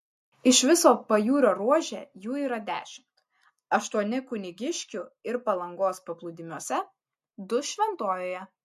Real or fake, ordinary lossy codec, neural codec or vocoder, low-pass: real; MP3, 64 kbps; none; 14.4 kHz